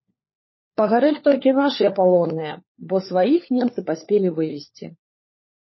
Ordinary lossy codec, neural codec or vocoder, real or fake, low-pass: MP3, 24 kbps; codec, 16 kHz, 4 kbps, FunCodec, trained on LibriTTS, 50 frames a second; fake; 7.2 kHz